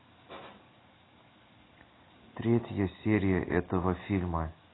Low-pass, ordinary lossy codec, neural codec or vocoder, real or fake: 7.2 kHz; AAC, 16 kbps; none; real